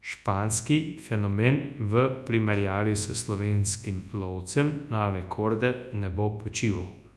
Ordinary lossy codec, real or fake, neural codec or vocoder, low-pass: none; fake; codec, 24 kHz, 0.9 kbps, WavTokenizer, large speech release; none